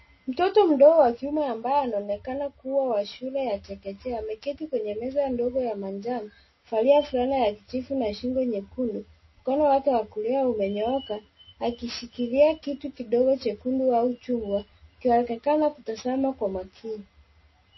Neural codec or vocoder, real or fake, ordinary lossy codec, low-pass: none; real; MP3, 24 kbps; 7.2 kHz